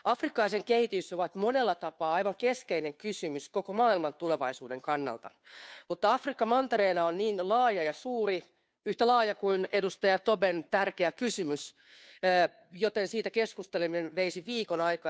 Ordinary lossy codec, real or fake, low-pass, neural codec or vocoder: none; fake; none; codec, 16 kHz, 2 kbps, FunCodec, trained on Chinese and English, 25 frames a second